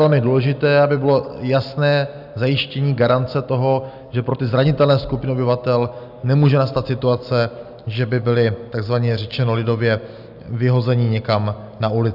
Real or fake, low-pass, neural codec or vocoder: real; 5.4 kHz; none